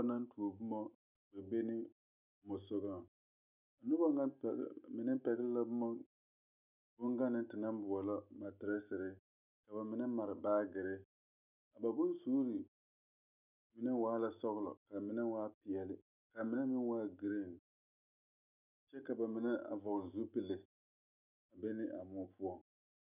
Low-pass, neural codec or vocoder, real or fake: 3.6 kHz; none; real